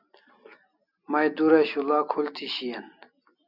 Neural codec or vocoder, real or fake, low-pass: none; real; 5.4 kHz